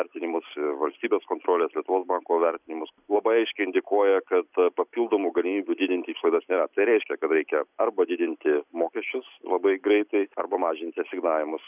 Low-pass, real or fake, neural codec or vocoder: 3.6 kHz; real; none